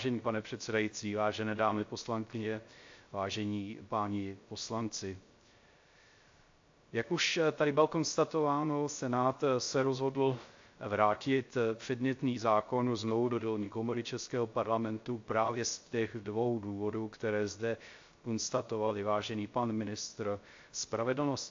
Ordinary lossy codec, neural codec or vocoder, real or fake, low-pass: AAC, 48 kbps; codec, 16 kHz, 0.3 kbps, FocalCodec; fake; 7.2 kHz